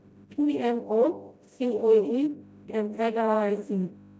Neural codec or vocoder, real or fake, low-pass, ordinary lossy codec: codec, 16 kHz, 0.5 kbps, FreqCodec, smaller model; fake; none; none